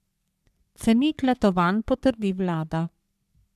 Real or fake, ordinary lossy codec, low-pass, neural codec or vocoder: fake; none; 14.4 kHz; codec, 44.1 kHz, 3.4 kbps, Pupu-Codec